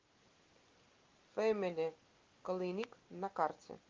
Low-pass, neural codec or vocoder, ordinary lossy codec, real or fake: 7.2 kHz; none; Opus, 32 kbps; real